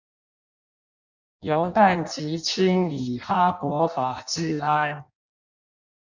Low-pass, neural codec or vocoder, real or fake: 7.2 kHz; codec, 16 kHz in and 24 kHz out, 0.6 kbps, FireRedTTS-2 codec; fake